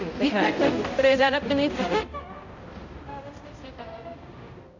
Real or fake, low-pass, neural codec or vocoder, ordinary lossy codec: fake; 7.2 kHz; codec, 16 kHz, 0.5 kbps, X-Codec, HuBERT features, trained on balanced general audio; none